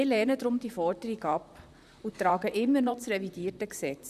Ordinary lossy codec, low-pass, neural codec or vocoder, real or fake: none; 14.4 kHz; vocoder, 44.1 kHz, 128 mel bands, Pupu-Vocoder; fake